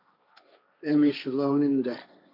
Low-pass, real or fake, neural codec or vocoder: 5.4 kHz; fake; codec, 16 kHz, 1.1 kbps, Voila-Tokenizer